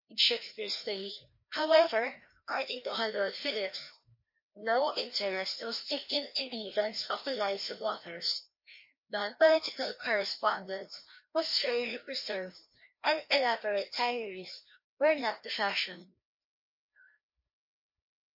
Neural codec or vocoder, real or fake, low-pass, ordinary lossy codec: codec, 16 kHz, 1 kbps, FreqCodec, larger model; fake; 5.4 kHz; MP3, 32 kbps